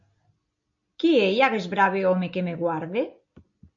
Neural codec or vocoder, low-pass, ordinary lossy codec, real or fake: none; 7.2 kHz; MP3, 48 kbps; real